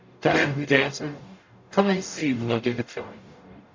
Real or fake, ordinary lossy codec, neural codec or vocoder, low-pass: fake; MP3, 64 kbps; codec, 44.1 kHz, 0.9 kbps, DAC; 7.2 kHz